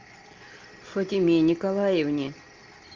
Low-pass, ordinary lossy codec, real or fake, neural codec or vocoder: 7.2 kHz; Opus, 24 kbps; real; none